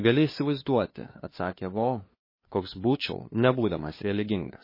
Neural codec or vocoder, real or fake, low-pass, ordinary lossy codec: codec, 16 kHz, 2 kbps, FunCodec, trained on LibriTTS, 25 frames a second; fake; 5.4 kHz; MP3, 24 kbps